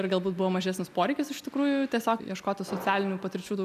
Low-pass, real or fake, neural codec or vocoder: 14.4 kHz; real; none